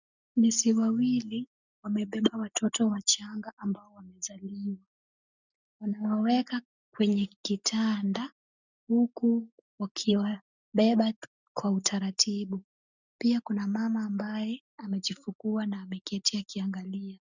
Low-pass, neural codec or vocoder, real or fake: 7.2 kHz; none; real